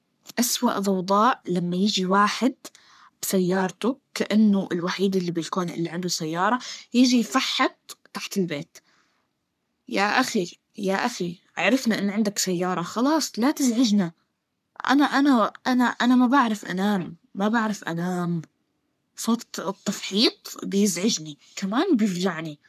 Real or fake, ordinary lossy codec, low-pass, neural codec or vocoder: fake; none; 14.4 kHz; codec, 44.1 kHz, 3.4 kbps, Pupu-Codec